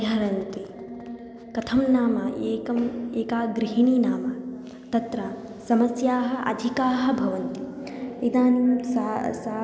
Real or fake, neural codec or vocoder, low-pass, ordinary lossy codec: real; none; none; none